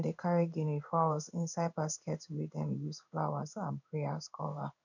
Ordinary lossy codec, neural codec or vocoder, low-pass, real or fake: none; codec, 16 kHz in and 24 kHz out, 1 kbps, XY-Tokenizer; 7.2 kHz; fake